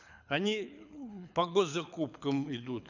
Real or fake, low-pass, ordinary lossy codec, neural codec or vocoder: fake; 7.2 kHz; none; codec, 24 kHz, 6 kbps, HILCodec